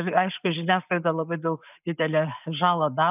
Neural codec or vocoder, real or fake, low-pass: none; real; 3.6 kHz